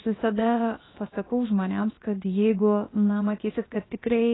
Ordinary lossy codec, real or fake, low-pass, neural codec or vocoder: AAC, 16 kbps; fake; 7.2 kHz; codec, 16 kHz, about 1 kbps, DyCAST, with the encoder's durations